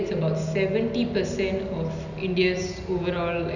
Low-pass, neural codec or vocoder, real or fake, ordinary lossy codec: 7.2 kHz; none; real; none